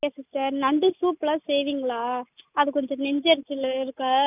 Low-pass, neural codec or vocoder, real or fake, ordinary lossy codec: 3.6 kHz; none; real; none